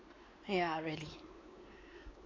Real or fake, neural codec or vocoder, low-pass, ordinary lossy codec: fake; codec, 16 kHz, 4 kbps, X-Codec, WavLM features, trained on Multilingual LibriSpeech; 7.2 kHz; AAC, 32 kbps